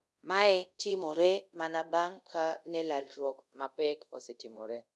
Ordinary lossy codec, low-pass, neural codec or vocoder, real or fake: none; none; codec, 24 kHz, 0.5 kbps, DualCodec; fake